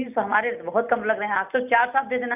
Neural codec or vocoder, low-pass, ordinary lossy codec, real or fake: none; 3.6 kHz; none; real